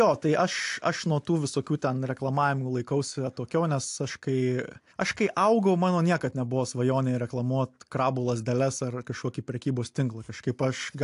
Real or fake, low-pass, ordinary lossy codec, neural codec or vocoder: real; 10.8 kHz; AAC, 64 kbps; none